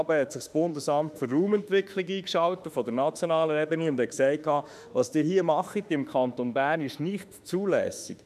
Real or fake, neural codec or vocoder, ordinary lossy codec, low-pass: fake; autoencoder, 48 kHz, 32 numbers a frame, DAC-VAE, trained on Japanese speech; none; 14.4 kHz